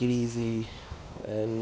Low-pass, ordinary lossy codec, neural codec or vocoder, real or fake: none; none; codec, 16 kHz, 2 kbps, X-Codec, WavLM features, trained on Multilingual LibriSpeech; fake